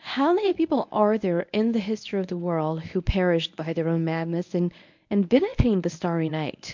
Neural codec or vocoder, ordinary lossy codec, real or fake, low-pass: codec, 24 kHz, 0.9 kbps, WavTokenizer, medium speech release version 1; MP3, 64 kbps; fake; 7.2 kHz